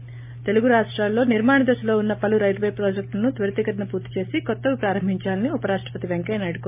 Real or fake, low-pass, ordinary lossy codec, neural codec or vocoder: real; 3.6 kHz; MP3, 32 kbps; none